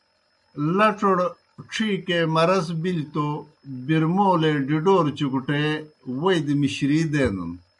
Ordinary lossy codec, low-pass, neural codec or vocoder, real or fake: MP3, 96 kbps; 10.8 kHz; none; real